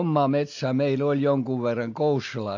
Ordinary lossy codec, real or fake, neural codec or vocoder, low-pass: AAC, 48 kbps; real; none; 7.2 kHz